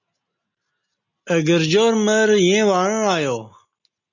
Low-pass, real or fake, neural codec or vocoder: 7.2 kHz; real; none